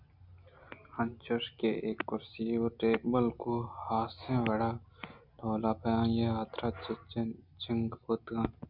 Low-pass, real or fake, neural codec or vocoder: 5.4 kHz; real; none